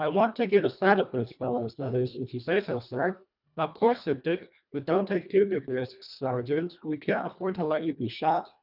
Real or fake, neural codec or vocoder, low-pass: fake; codec, 24 kHz, 1.5 kbps, HILCodec; 5.4 kHz